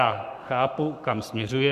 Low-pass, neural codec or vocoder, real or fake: 14.4 kHz; codec, 44.1 kHz, 7.8 kbps, Pupu-Codec; fake